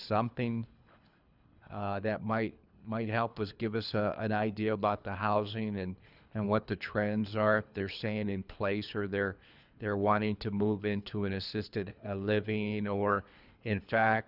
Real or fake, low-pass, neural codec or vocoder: fake; 5.4 kHz; codec, 24 kHz, 3 kbps, HILCodec